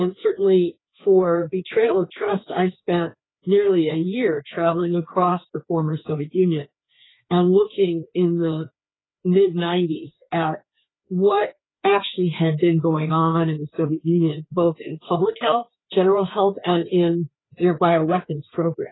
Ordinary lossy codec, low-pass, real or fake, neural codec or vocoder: AAC, 16 kbps; 7.2 kHz; fake; codec, 16 kHz, 2 kbps, FreqCodec, larger model